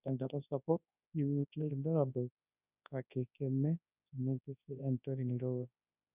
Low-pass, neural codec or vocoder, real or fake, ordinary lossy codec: 3.6 kHz; codec, 24 kHz, 0.9 kbps, WavTokenizer, large speech release; fake; none